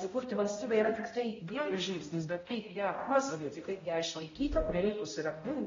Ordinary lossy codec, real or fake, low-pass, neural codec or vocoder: AAC, 24 kbps; fake; 7.2 kHz; codec, 16 kHz, 0.5 kbps, X-Codec, HuBERT features, trained on balanced general audio